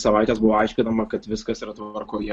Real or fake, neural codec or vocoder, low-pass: real; none; 9.9 kHz